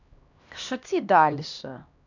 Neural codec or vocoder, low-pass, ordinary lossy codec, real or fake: codec, 16 kHz, 1 kbps, X-Codec, HuBERT features, trained on LibriSpeech; 7.2 kHz; none; fake